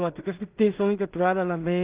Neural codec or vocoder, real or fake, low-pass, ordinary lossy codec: codec, 16 kHz in and 24 kHz out, 0.4 kbps, LongCat-Audio-Codec, two codebook decoder; fake; 3.6 kHz; Opus, 32 kbps